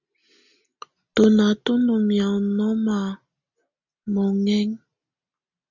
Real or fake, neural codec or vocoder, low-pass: real; none; 7.2 kHz